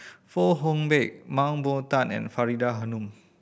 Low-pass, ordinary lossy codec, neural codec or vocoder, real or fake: none; none; none; real